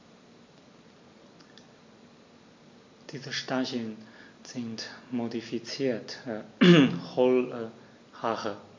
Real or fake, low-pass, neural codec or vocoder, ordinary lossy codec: real; 7.2 kHz; none; MP3, 48 kbps